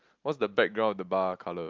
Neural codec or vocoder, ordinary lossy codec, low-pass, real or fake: none; Opus, 32 kbps; 7.2 kHz; real